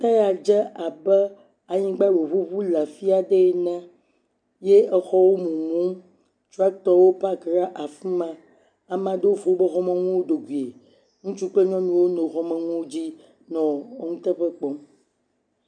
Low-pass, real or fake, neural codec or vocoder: 9.9 kHz; real; none